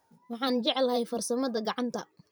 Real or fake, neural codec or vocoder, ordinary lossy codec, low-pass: fake; vocoder, 44.1 kHz, 128 mel bands every 512 samples, BigVGAN v2; none; none